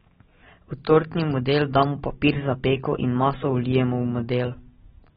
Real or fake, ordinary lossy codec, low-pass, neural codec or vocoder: real; AAC, 16 kbps; 10.8 kHz; none